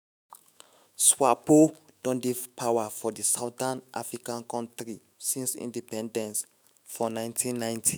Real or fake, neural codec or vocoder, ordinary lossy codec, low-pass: fake; autoencoder, 48 kHz, 128 numbers a frame, DAC-VAE, trained on Japanese speech; none; none